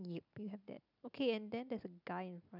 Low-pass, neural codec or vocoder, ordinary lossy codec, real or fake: 5.4 kHz; none; none; real